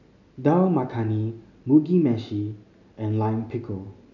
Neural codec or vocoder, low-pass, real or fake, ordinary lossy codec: none; 7.2 kHz; real; none